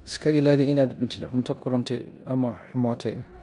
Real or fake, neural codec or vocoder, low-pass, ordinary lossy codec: fake; codec, 16 kHz in and 24 kHz out, 0.9 kbps, LongCat-Audio-Codec, four codebook decoder; 10.8 kHz; none